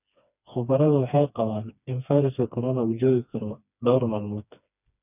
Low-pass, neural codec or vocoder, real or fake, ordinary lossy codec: 3.6 kHz; codec, 16 kHz, 2 kbps, FreqCodec, smaller model; fake; none